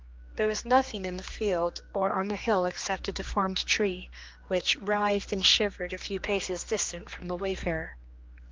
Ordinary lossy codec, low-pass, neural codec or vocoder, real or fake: Opus, 32 kbps; 7.2 kHz; codec, 16 kHz, 2 kbps, X-Codec, HuBERT features, trained on general audio; fake